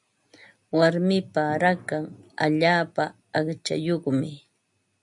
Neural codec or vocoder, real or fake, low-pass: none; real; 10.8 kHz